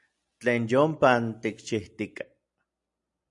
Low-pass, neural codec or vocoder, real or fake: 10.8 kHz; none; real